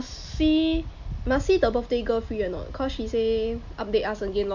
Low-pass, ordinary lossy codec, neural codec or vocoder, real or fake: 7.2 kHz; none; none; real